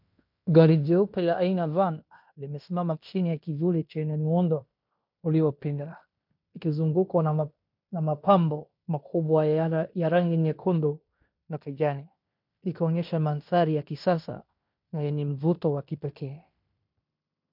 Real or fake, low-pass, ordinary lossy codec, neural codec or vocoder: fake; 5.4 kHz; MP3, 48 kbps; codec, 16 kHz in and 24 kHz out, 0.9 kbps, LongCat-Audio-Codec, fine tuned four codebook decoder